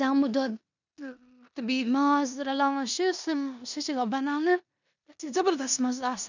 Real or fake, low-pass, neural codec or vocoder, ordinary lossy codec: fake; 7.2 kHz; codec, 16 kHz in and 24 kHz out, 0.9 kbps, LongCat-Audio-Codec, four codebook decoder; none